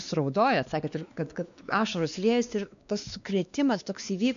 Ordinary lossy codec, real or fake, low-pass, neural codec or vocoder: MP3, 96 kbps; fake; 7.2 kHz; codec, 16 kHz, 2 kbps, X-Codec, WavLM features, trained on Multilingual LibriSpeech